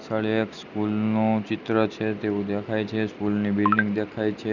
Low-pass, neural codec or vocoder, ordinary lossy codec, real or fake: 7.2 kHz; none; none; real